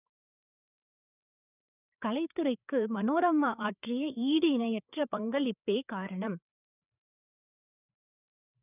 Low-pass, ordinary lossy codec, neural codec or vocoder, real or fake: 3.6 kHz; none; codec, 16 kHz, 4 kbps, FreqCodec, larger model; fake